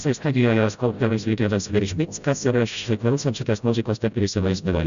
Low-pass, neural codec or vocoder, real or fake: 7.2 kHz; codec, 16 kHz, 0.5 kbps, FreqCodec, smaller model; fake